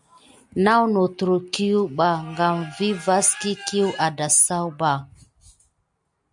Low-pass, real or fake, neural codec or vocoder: 10.8 kHz; real; none